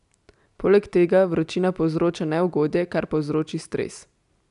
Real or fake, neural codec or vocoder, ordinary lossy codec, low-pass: real; none; none; 10.8 kHz